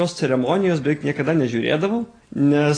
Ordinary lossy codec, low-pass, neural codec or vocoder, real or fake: AAC, 32 kbps; 9.9 kHz; none; real